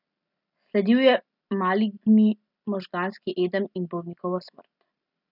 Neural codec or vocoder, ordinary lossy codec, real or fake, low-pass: none; none; real; 5.4 kHz